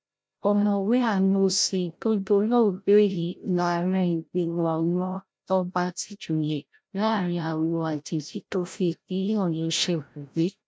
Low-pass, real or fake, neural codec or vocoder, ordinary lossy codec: none; fake; codec, 16 kHz, 0.5 kbps, FreqCodec, larger model; none